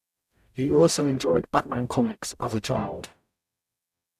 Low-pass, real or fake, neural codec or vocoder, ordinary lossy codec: 14.4 kHz; fake; codec, 44.1 kHz, 0.9 kbps, DAC; none